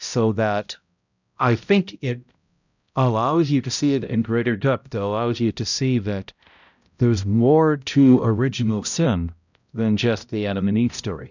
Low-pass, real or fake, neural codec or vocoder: 7.2 kHz; fake; codec, 16 kHz, 0.5 kbps, X-Codec, HuBERT features, trained on balanced general audio